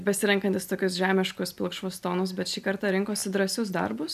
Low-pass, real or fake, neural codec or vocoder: 14.4 kHz; real; none